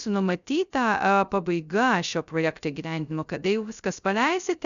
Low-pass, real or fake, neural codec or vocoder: 7.2 kHz; fake; codec, 16 kHz, 0.2 kbps, FocalCodec